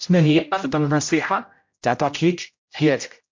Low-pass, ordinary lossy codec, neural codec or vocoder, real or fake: 7.2 kHz; MP3, 48 kbps; codec, 16 kHz, 0.5 kbps, X-Codec, HuBERT features, trained on general audio; fake